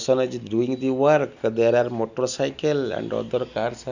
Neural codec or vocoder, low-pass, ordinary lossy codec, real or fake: none; 7.2 kHz; none; real